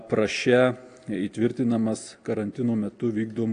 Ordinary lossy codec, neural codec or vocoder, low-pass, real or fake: AAC, 48 kbps; none; 9.9 kHz; real